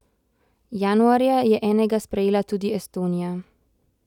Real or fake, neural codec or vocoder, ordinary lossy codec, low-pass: real; none; none; 19.8 kHz